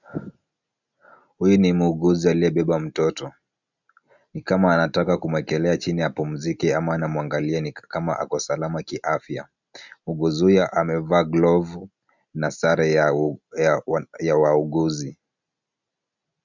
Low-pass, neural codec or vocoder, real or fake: 7.2 kHz; none; real